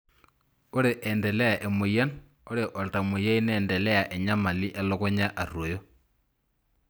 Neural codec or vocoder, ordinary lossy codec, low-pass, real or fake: none; none; none; real